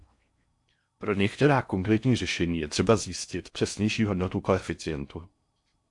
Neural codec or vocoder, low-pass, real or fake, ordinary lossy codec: codec, 16 kHz in and 24 kHz out, 0.6 kbps, FocalCodec, streaming, 4096 codes; 10.8 kHz; fake; MP3, 64 kbps